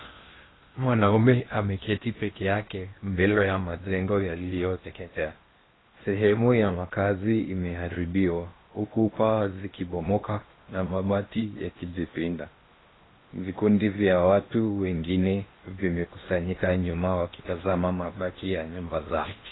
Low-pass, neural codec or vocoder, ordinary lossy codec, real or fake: 7.2 kHz; codec, 16 kHz in and 24 kHz out, 0.8 kbps, FocalCodec, streaming, 65536 codes; AAC, 16 kbps; fake